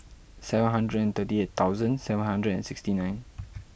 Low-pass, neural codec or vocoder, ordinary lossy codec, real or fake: none; none; none; real